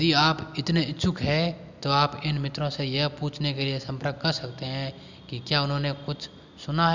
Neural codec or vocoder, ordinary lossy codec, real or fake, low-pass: none; none; real; 7.2 kHz